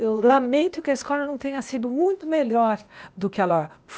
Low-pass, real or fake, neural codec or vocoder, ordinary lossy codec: none; fake; codec, 16 kHz, 0.8 kbps, ZipCodec; none